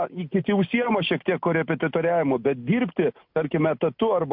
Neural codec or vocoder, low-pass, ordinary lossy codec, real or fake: none; 5.4 kHz; MP3, 48 kbps; real